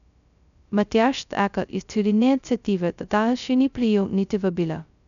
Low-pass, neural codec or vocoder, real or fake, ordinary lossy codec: 7.2 kHz; codec, 16 kHz, 0.2 kbps, FocalCodec; fake; none